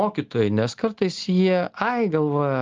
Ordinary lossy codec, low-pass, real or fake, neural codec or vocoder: Opus, 24 kbps; 7.2 kHz; real; none